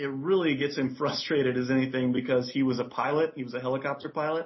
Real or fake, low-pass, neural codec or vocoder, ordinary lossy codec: real; 7.2 kHz; none; MP3, 24 kbps